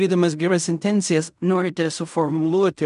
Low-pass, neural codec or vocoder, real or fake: 10.8 kHz; codec, 16 kHz in and 24 kHz out, 0.4 kbps, LongCat-Audio-Codec, fine tuned four codebook decoder; fake